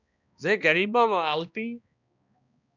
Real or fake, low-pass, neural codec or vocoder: fake; 7.2 kHz; codec, 16 kHz, 1 kbps, X-Codec, HuBERT features, trained on balanced general audio